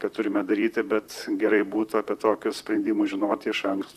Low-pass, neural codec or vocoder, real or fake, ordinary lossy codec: 14.4 kHz; vocoder, 44.1 kHz, 128 mel bands, Pupu-Vocoder; fake; AAC, 96 kbps